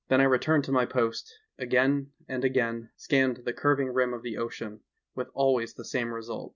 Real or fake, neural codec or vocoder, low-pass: real; none; 7.2 kHz